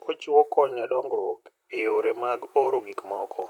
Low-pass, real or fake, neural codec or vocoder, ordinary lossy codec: 19.8 kHz; fake; vocoder, 44.1 kHz, 128 mel bands, Pupu-Vocoder; none